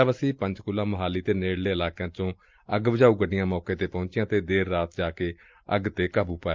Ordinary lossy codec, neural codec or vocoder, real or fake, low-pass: Opus, 24 kbps; none; real; 7.2 kHz